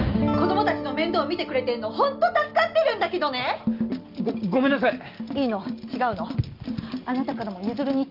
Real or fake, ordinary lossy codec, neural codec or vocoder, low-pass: real; Opus, 24 kbps; none; 5.4 kHz